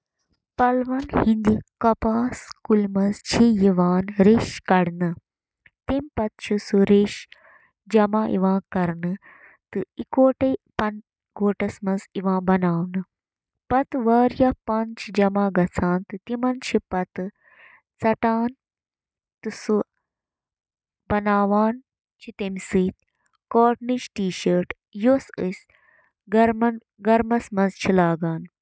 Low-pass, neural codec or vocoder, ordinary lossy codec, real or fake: none; none; none; real